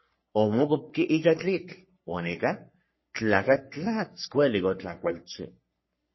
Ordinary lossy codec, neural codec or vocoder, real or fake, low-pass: MP3, 24 kbps; codec, 44.1 kHz, 3.4 kbps, Pupu-Codec; fake; 7.2 kHz